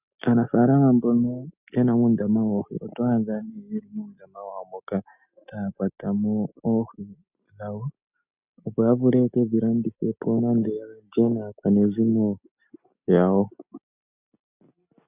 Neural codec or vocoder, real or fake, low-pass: none; real; 3.6 kHz